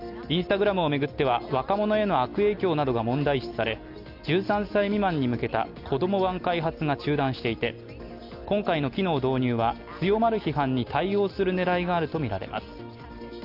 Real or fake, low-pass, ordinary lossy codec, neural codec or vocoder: real; 5.4 kHz; Opus, 32 kbps; none